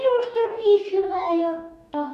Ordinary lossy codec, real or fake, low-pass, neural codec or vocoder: none; fake; 14.4 kHz; codec, 44.1 kHz, 2.6 kbps, DAC